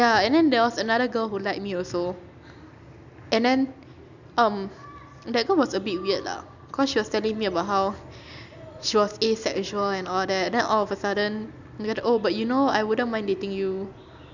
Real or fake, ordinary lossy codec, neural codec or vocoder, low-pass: real; none; none; 7.2 kHz